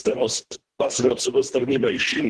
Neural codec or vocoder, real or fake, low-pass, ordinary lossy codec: codec, 24 kHz, 1.5 kbps, HILCodec; fake; 10.8 kHz; Opus, 16 kbps